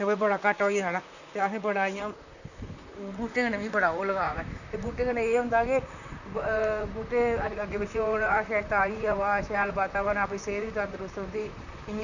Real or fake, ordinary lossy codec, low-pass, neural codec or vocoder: fake; none; 7.2 kHz; vocoder, 44.1 kHz, 128 mel bands, Pupu-Vocoder